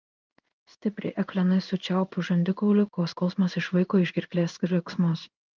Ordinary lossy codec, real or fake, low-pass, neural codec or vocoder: Opus, 24 kbps; fake; 7.2 kHz; codec, 16 kHz in and 24 kHz out, 1 kbps, XY-Tokenizer